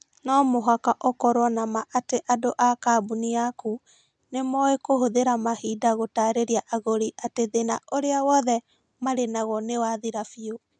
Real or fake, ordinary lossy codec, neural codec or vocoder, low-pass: real; none; none; 9.9 kHz